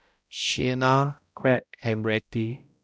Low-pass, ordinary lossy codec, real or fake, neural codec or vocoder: none; none; fake; codec, 16 kHz, 0.5 kbps, X-Codec, HuBERT features, trained on balanced general audio